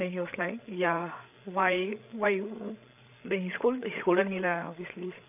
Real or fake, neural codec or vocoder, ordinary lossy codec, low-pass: fake; codec, 16 kHz, 8 kbps, FreqCodec, larger model; AAC, 32 kbps; 3.6 kHz